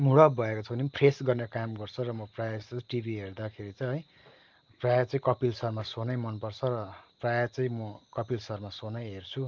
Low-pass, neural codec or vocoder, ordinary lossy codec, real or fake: 7.2 kHz; none; Opus, 32 kbps; real